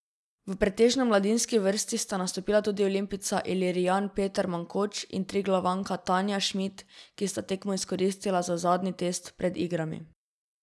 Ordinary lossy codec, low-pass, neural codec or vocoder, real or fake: none; none; none; real